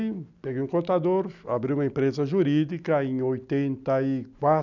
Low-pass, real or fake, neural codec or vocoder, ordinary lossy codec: 7.2 kHz; real; none; none